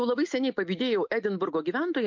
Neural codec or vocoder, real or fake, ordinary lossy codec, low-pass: none; real; MP3, 48 kbps; 7.2 kHz